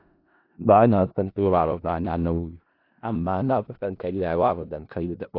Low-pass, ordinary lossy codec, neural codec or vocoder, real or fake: 5.4 kHz; AAC, 32 kbps; codec, 16 kHz in and 24 kHz out, 0.4 kbps, LongCat-Audio-Codec, four codebook decoder; fake